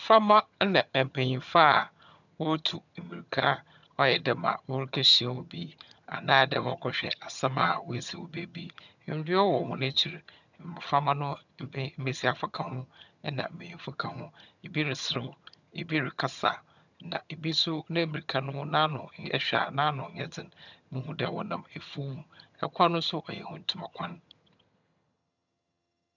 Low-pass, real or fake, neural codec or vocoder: 7.2 kHz; fake; vocoder, 22.05 kHz, 80 mel bands, HiFi-GAN